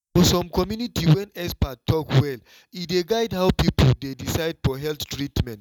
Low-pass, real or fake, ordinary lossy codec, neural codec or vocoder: 19.8 kHz; real; none; none